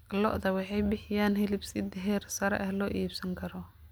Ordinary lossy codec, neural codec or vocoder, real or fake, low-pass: none; none; real; none